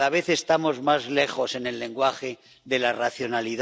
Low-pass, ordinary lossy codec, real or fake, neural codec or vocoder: none; none; real; none